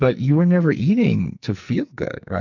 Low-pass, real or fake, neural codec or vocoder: 7.2 kHz; fake; codec, 44.1 kHz, 2.6 kbps, SNAC